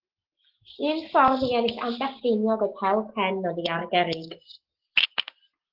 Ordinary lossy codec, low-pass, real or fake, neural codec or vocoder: Opus, 16 kbps; 5.4 kHz; real; none